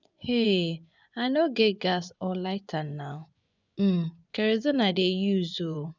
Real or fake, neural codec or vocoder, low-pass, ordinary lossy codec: fake; vocoder, 24 kHz, 100 mel bands, Vocos; 7.2 kHz; none